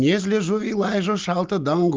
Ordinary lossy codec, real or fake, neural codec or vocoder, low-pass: Opus, 24 kbps; real; none; 7.2 kHz